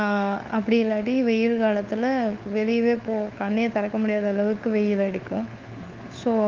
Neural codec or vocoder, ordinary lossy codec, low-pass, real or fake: codec, 16 kHz, 4 kbps, FunCodec, trained on LibriTTS, 50 frames a second; Opus, 32 kbps; 7.2 kHz; fake